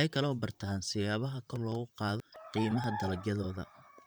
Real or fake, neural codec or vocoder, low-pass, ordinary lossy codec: fake; vocoder, 44.1 kHz, 128 mel bands, Pupu-Vocoder; none; none